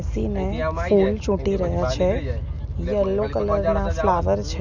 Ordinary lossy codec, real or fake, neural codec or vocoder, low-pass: none; real; none; 7.2 kHz